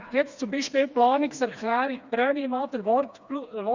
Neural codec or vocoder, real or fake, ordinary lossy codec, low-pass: codec, 16 kHz, 2 kbps, FreqCodec, smaller model; fake; Opus, 64 kbps; 7.2 kHz